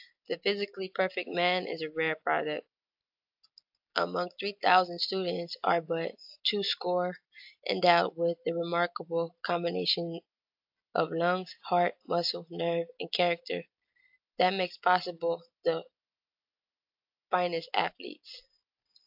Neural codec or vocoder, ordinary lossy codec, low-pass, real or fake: none; AAC, 48 kbps; 5.4 kHz; real